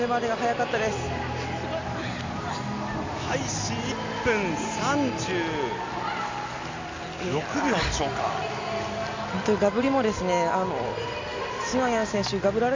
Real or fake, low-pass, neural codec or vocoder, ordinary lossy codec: real; 7.2 kHz; none; MP3, 64 kbps